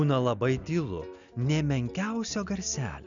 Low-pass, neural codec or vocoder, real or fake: 7.2 kHz; none; real